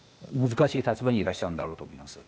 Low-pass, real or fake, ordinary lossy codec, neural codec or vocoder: none; fake; none; codec, 16 kHz, 0.8 kbps, ZipCodec